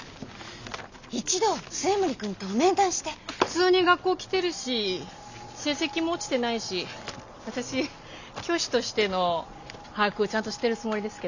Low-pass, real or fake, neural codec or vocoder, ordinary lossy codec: 7.2 kHz; real; none; none